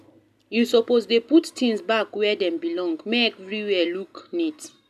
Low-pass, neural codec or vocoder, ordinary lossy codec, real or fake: 14.4 kHz; none; none; real